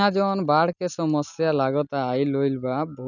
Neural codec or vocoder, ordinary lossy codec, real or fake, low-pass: none; none; real; 7.2 kHz